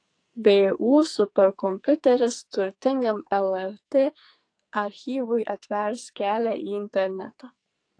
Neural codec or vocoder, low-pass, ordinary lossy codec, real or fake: codec, 44.1 kHz, 2.6 kbps, SNAC; 9.9 kHz; AAC, 48 kbps; fake